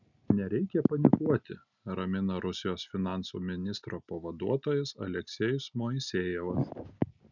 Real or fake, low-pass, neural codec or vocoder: real; 7.2 kHz; none